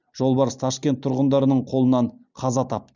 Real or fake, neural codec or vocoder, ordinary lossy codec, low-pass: real; none; none; 7.2 kHz